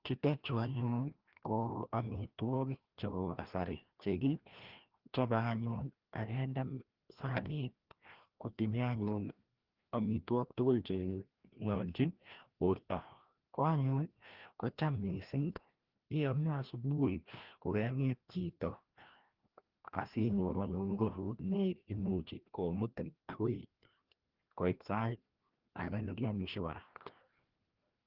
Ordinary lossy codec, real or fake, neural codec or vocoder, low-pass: Opus, 16 kbps; fake; codec, 16 kHz, 1 kbps, FreqCodec, larger model; 5.4 kHz